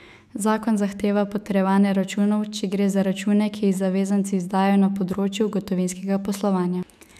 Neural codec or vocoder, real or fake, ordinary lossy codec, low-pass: autoencoder, 48 kHz, 128 numbers a frame, DAC-VAE, trained on Japanese speech; fake; none; 14.4 kHz